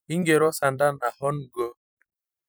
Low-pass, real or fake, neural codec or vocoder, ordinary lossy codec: none; fake; vocoder, 44.1 kHz, 128 mel bands every 256 samples, BigVGAN v2; none